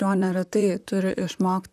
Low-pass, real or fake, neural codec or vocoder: 14.4 kHz; fake; vocoder, 44.1 kHz, 128 mel bands, Pupu-Vocoder